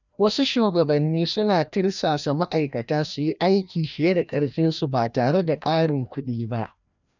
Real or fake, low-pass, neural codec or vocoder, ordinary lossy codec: fake; 7.2 kHz; codec, 16 kHz, 1 kbps, FreqCodec, larger model; none